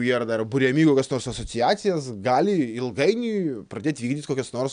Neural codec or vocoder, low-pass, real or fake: none; 9.9 kHz; real